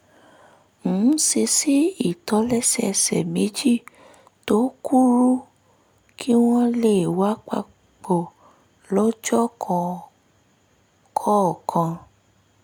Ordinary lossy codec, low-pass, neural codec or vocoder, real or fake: none; none; none; real